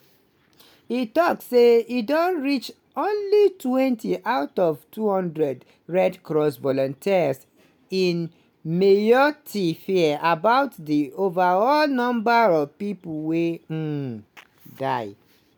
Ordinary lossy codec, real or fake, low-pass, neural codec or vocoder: none; real; none; none